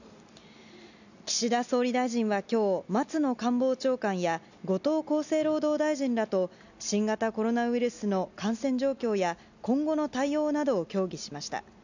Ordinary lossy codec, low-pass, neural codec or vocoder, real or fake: none; 7.2 kHz; none; real